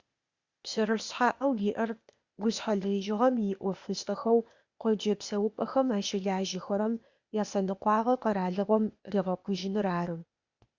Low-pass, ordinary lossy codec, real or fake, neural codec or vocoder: 7.2 kHz; Opus, 64 kbps; fake; codec, 16 kHz, 0.8 kbps, ZipCodec